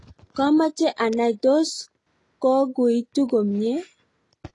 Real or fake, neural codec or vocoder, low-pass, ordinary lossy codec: real; none; 10.8 kHz; AAC, 32 kbps